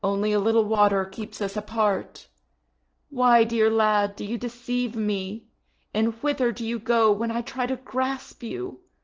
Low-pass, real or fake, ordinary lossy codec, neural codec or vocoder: 7.2 kHz; real; Opus, 16 kbps; none